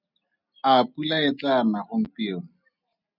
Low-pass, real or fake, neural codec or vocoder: 5.4 kHz; real; none